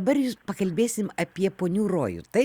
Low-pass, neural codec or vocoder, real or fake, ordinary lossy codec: 19.8 kHz; none; real; Opus, 64 kbps